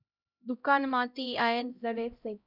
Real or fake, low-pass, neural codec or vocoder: fake; 5.4 kHz; codec, 16 kHz, 0.5 kbps, X-Codec, HuBERT features, trained on LibriSpeech